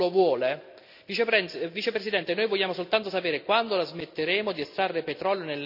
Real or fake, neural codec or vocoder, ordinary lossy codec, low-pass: real; none; none; 5.4 kHz